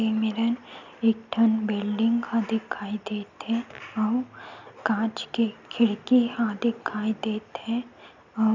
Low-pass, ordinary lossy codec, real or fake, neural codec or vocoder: 7.2 kHz; none; real; none